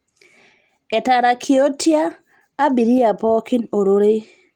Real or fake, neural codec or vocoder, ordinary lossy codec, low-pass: real; none; Opus, 24 kbps; 19.8 kHz